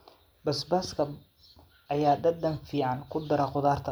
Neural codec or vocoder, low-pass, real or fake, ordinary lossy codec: none; none; real; none